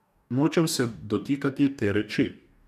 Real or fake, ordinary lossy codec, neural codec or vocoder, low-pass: fake; none; codec, 44.1 kHz, 2.6 kbps, DAC; 14.4 kHz